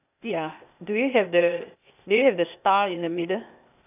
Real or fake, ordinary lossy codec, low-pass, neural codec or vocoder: fake; none; 3.6 kHz; codec, 16 kHz, 0.8 kbps, ZipCodec